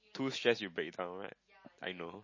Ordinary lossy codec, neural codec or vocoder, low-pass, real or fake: MP3, 32 kbps; none; 7.2 kHz; real